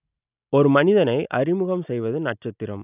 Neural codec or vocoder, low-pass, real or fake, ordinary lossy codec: none; 3.6 kHz; real; none